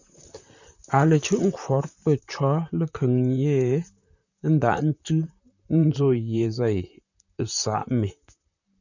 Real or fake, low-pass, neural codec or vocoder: fake; 7.2 kHz; vocoder, 44.1 kHz, 128 mel bands, Pupu-Vocoder